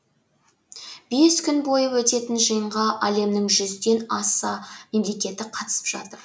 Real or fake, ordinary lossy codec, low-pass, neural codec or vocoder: real; none; none; none